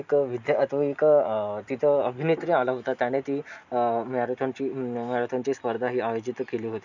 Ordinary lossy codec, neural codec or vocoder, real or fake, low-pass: none; none; real; 7.2 kHz